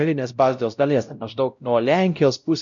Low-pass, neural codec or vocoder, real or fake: 7.2 kHz; codec, 16 kHz, 0.5 kbps, X-Codec, WavLM features, trained on Multilingual LibriSpeech; fake